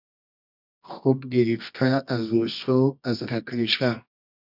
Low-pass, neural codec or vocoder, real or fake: 5.4 kHz; codec, 24 kHz, 0.9 kbps, WavTokenizer, medium music audio release; fake